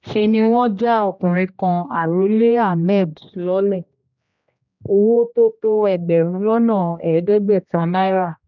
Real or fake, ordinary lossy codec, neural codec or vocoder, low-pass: fake; none; codec, 16 kHz, 1 kbps, X-Codec, HuBERT features, trained on general audio; 7.2 kHz